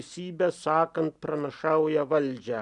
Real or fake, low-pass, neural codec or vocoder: real; 10.8 kHz; none